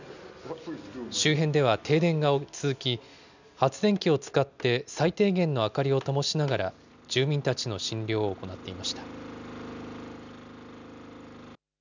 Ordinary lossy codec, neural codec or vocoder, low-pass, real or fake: none; none; 7.2 kHz; real